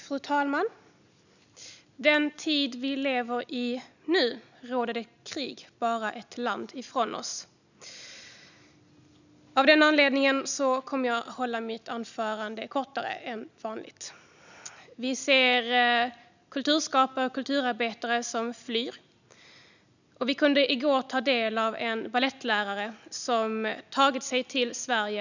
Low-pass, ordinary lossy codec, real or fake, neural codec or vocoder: 7.2 kHz; none; real; none